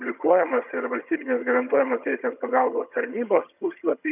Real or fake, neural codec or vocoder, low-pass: fake; vocoder, 22.05 kHz, 80 mel bands, HiFi-GAN; 3.6 kHz